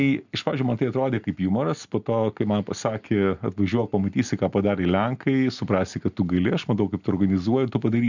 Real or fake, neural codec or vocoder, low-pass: real; none; 7.2 kHz